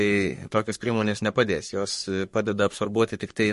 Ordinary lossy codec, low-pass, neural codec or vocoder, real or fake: MP3, 48 kbps; 14.4 kHz; codec, 44.1 kHz, 3.4 kbps, Pupu-Codec; fake